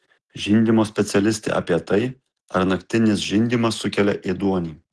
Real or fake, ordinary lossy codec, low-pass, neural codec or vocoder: real; Opus, 16 kbps; 10.8 kHz; none